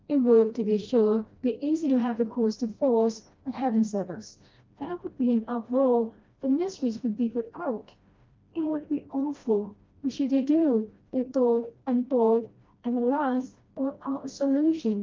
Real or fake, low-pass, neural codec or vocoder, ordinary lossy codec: fake; 7.2 kHz; codec, 16 kHz, 1 kbps, FreqCodec, smaller model; Opus, 24 kbps